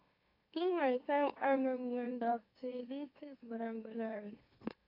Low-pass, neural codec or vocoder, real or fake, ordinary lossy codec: 5.4 kHz; autoencoder, 44.1 kHz, a latent of 192 numbers a frame, MeloTTS; fake; AAC, 32 kbps